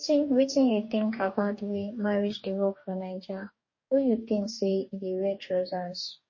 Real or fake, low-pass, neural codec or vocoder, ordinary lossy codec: fake; 7.2 kHz; codec, 44.1 kHz, 2.6 kbps, DAC; MP3, 32 kbps